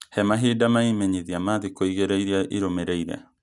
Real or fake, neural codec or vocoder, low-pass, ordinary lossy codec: real; none; 10.8 kHz; none